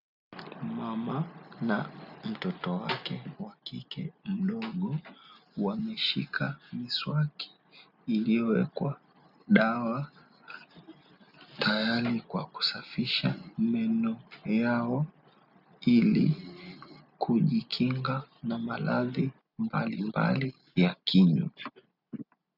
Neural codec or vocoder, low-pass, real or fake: none; 5.4 kHz; real